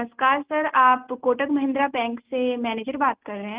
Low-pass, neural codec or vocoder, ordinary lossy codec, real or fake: 3.6 kHz; none; Opus, 32 kbps; real